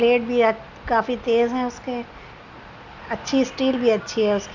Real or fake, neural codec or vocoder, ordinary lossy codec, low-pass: real; none; none; 7.2 kHz